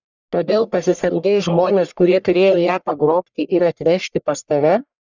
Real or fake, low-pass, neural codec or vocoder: fake; 7.2 kHz; codec, 44.1 kHz, 1.7 kbps, Pupu-Codec